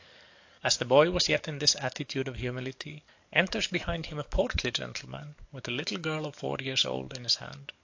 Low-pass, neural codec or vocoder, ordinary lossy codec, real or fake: 7.2 kHz; codec, 44.1 kHz, 7.8 kbps, DAC; AAC, 48 kbps; fake